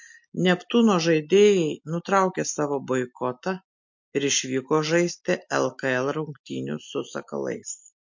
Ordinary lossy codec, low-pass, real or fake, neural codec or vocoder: MP3, 48 kbps; 7.2 kHz; real; none